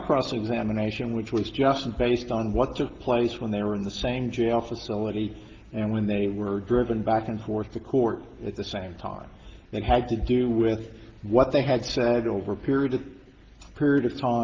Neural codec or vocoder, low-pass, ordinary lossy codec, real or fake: none; 7.2 kHz; Opus, 16 kbps; real